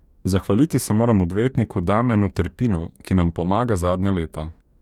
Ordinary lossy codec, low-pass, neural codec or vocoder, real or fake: none; 19.8 kHz; codec, 44.1 kHz, 2.6 kbps, DAC; fake